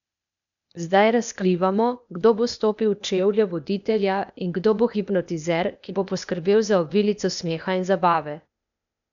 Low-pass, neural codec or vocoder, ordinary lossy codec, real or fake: 7.2 kHz; codec, 16 kHz, 0.8 kbps, ZipCodec; none; fake